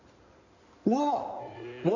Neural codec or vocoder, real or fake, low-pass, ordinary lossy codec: codec, 16 kHz in and 24 kHz out, 2.2 kbps, FireRedTTS-2 codec; fake; 7.2 kHz; none